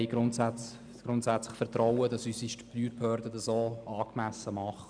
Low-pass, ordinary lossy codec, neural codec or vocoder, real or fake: 9.9 kHz; none; none; real